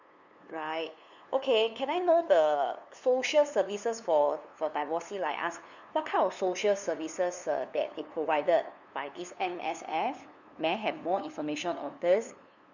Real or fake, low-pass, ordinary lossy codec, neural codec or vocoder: fake; 7.2 kHz; none; codec, 16 kHz, 2 kbps, FunCodec, trained on LibriTTS, 25 frames a second